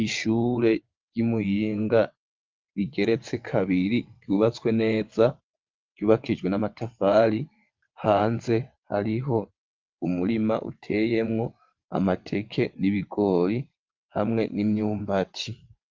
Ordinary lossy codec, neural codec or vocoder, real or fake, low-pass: Opus, 32 kbps; vocoder, 22.05 kHz, 80 mel bands, WaveNeXt; fake; 7.2 kHz